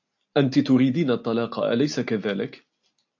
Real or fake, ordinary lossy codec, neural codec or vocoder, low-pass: real; AAC, 48 kbps; none; 7.2 kHz